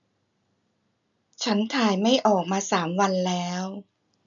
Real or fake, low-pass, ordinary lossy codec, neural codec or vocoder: real; 7.2 kHz; none; none